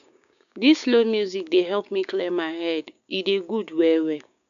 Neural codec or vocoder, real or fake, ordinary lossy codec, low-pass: codec, 16 kHz, 6 kbps, DAC; fake; none; 7.2 kHz